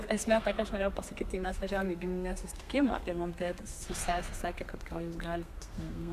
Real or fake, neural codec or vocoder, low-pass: fake; codec, 32 kHz, 1.9 kbps, SNAC; 14.4 kHz